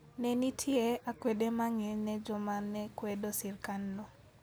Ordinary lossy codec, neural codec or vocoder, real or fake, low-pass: none; none; real; none